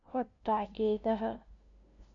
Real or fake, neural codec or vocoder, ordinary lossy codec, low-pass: fake; codec, 16 kHz, 0.5 kbps, FunCodec, trained on LibriTTS, 25 frames a second; none; 7.2 kHz